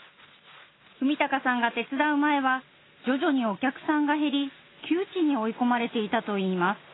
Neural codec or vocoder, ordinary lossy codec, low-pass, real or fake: none; AAC, 16 kbps; 7.2 kHz; real